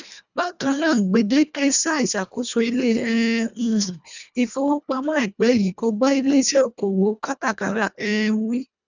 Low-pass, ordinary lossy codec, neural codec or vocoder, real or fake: 7.2 kHz; none; codec, 24 kHz, 1.5 kbps, HILCodec; fake